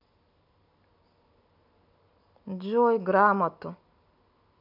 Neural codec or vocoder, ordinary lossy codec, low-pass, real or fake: none; MP3, 48 kbps; 5.4 kHz; real